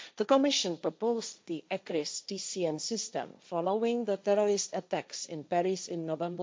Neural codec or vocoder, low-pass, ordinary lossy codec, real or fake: codec, 16 kHz, 1.1 kbps, Voila-Tokenizer; none; none; fake